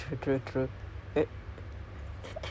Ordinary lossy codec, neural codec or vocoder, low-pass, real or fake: none; none; none; real